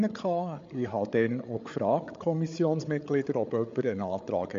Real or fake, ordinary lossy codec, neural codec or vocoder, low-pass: fake; MP3, 48 kbps; codec, 16 kHz, 8 kbps, FreqCodec, larger model; 7.2 kHz